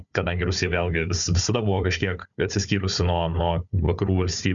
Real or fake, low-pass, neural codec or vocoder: fake; 7.2 kHz; codec, 16 kHz, 4 kbps, FunCodec, trained on Chinese and English, 50 frames a second